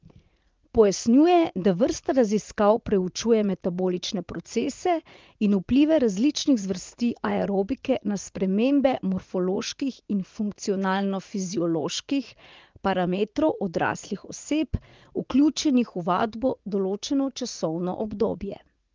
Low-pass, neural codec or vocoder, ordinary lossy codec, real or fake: 7.2 kHz; vocoder, 44.1 kHz, 80 mel bands, Vocos; Opus, 32 kbps; fake